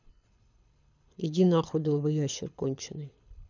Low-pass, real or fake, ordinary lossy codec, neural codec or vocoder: 7.2 kHz; fake; none; codec, 24 kHz, 6 kbps, HILCodec